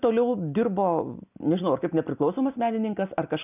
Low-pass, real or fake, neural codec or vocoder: 3.6 kHz; real; none